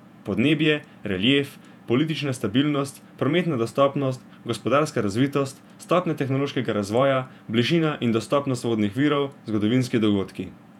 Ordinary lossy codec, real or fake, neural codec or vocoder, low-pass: none; fake; vocoder, 48 kHz, 128 mel bands, Vocos; 19.8 kHz